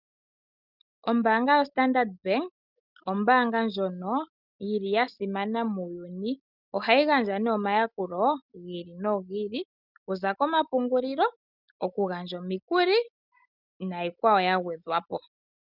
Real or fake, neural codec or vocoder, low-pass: real; none; 5.4 kHz